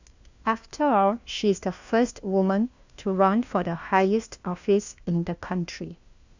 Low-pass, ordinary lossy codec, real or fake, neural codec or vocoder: 7.2 kHz; Opus, 64 kbps; fake; codec, 16 kHz, 1 kbps, FunCodec, trained on LibriTTS, 50 frames a second